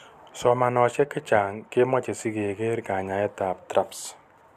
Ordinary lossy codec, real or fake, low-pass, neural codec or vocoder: none; real; 14.4 kHz; none